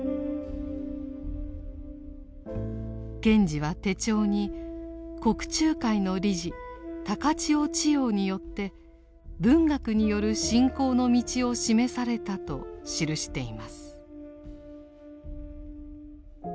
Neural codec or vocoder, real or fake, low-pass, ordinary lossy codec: none; real; none; none